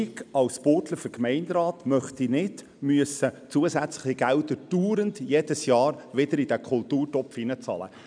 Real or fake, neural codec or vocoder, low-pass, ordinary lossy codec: real; none; 9.9 kHz; none